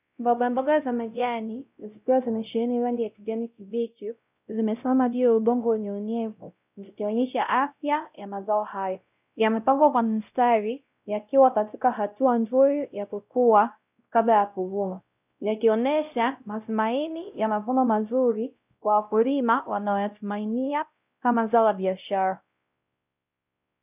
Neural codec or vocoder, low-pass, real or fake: codec, 16 kHz, 0.5 kbps, X-Codec, WavLM features, trained on Multilingual LibriSpeech; 3.6 kHz; fake